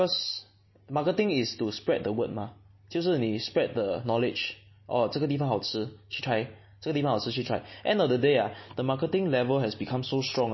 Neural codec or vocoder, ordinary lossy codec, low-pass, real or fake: none; MP3, 24 kbps; 7.2 kHz; real